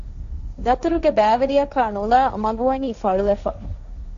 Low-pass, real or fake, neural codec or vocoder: 7.2 kHz; fake; codec, 16 kHz, 1.1 kbps, Voila-Tokenizer